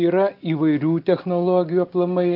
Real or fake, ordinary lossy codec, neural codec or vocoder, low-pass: real; Opus, 32 kbps; none; 5.4 kHz